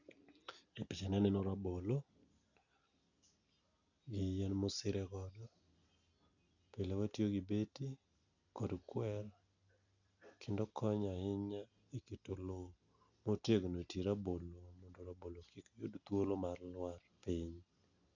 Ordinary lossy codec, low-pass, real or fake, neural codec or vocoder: none; 7.2 kHz; real; none